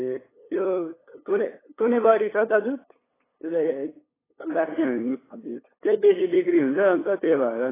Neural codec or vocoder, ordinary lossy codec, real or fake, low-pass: codec, 16 kHz, 8 kbps, FunCodec, trained on LibriTTS, 25 frames a second; AAC, 16 kbps; fake; 3.6 kHz